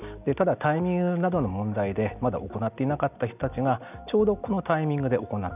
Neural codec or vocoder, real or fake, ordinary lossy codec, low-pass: none; real; none; 3.6 kHz